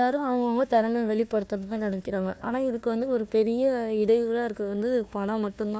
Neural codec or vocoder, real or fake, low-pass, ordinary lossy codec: codec, 16 kHz, 1 kbps, FunCodec, trained on Chinese and English, 50 frames a second; fake; none; none